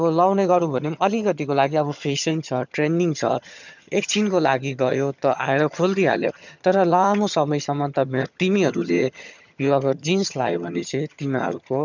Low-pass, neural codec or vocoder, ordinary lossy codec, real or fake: 7.2 kHz; vocoder, 22.05 kHz, 80 mel bands, HiFi-GAN; none; fake